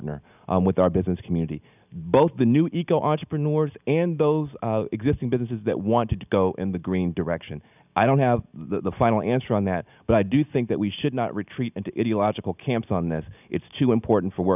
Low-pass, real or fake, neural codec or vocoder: 3.6 kHz; real; none